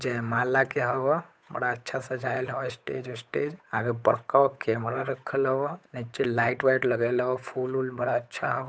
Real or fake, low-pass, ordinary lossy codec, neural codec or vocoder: fake; none; none; codec, 16 kHz, 8 kbps, FunCodec, trained on Chinese and English, 25 frames a second